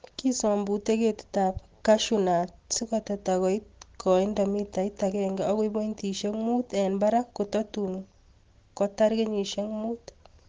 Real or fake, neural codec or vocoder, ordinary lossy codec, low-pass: real; none; Opus, 16 kbps; 7.2 kHz